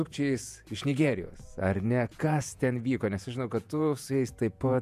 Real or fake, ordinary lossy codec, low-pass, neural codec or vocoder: fake; MP3, 96 kbps; 14.4 kHz; vocoder, 48 kHz, 128 mel bands, Vocos